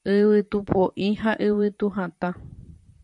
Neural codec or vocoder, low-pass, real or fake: vocoder, 44.1 kHz, 128 mel bands, Pupu-Vocoder; 10.8 kHz; fake